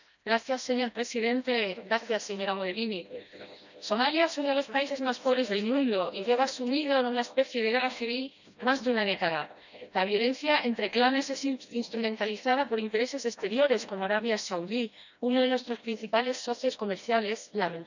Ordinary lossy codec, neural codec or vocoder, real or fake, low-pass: none; codec, 16 kHz, 1 kbps, FreqCodec, smaller model; fake; 7.2 kHz